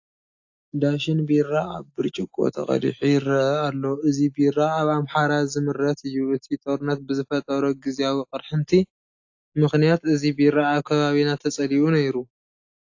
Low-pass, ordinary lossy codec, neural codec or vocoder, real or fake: 7.2 kHz; AAC, 48 kbps; none; real